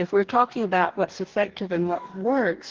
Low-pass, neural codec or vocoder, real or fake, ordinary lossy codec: 7.2 kHz; codec, 44.1 kHz, 2.6 kbps, DAC; fake; Opus, 16 kbps